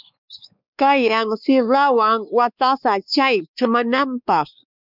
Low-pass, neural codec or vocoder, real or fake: 5.4 kHz; codec, 16 kHz, 2 kbps, X-Codec, WavLM features, trained on Multilingual LibriSpeech; fake